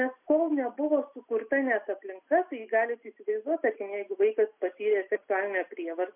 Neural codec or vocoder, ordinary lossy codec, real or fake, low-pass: none; MP3, 32 kbps; real; 3.6 kHz